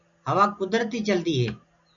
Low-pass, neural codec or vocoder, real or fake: 7.2 kHz; none; real